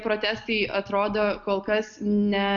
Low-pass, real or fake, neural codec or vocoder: 7.2 kHz; real; none